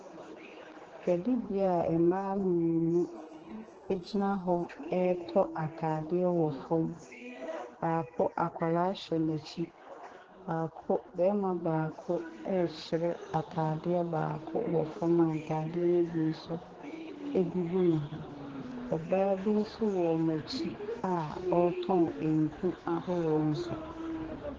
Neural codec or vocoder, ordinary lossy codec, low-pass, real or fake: codec, 16 kHz, 4 kbps, X-Codec, HuBERT features, trained on general audio; Opus, 16 kbps; 7.2 kHz; fake